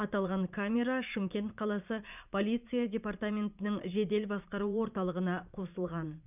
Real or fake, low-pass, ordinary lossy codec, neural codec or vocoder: fake; 3.6 kHz; none; vocoder, 44.1 kHz, 80 mel bands, Vocos